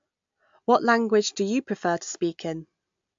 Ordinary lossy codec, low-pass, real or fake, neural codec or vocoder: AAC, 64 kbps; 7.2 kHz; real; none